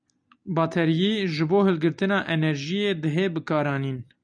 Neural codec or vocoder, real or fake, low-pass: none; real; 9.9 kHz